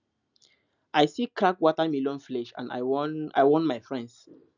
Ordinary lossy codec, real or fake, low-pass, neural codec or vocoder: none; real; 7.2 kHz; none